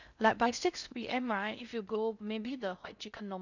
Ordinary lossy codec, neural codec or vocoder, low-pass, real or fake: none; codec, 16 kHz in and 24 kHz out, 0.6 kbps, FocalCodec, streaming, 4096 codes; 7.2 kHz; fake